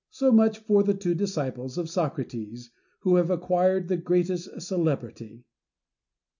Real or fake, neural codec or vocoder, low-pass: real; none; 7.2 kHz